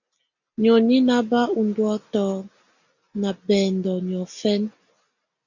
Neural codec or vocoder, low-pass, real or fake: none; 7.2 kHz; real